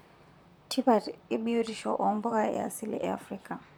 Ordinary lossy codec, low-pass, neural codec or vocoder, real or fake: none; none; vocoder, 44.1 kHz, 128 mel bands, Pupu-Vocoder; fake